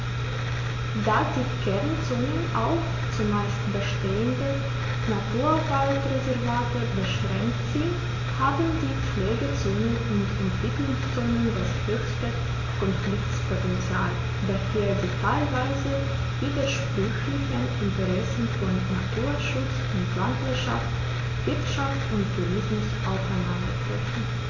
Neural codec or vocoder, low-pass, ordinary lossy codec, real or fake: none; 7.2 kHz; AAC, 32 kbps; real